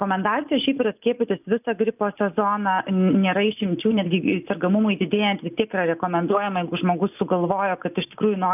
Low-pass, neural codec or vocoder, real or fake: 3.6 kHz; none; real